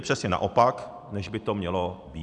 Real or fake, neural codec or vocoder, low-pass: real; none; 10.8 kHz